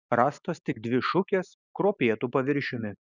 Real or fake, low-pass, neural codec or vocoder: real; 7.2 kHz; none